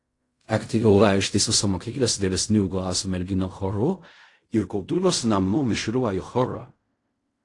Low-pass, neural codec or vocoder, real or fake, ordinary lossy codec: 10.8 kHz; codec, 16 kHz in and 24 kHz out, 0.4 kbps, LongCat-Audio-Codec, fine tuned four codebook decoder; fake; AAC, 48 kbps